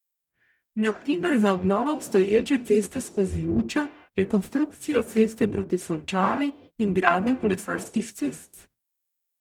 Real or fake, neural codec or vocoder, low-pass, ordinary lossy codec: fake; codec, 44.1 kHz, 0.9 kbps, DAC; 19.8 kHz; none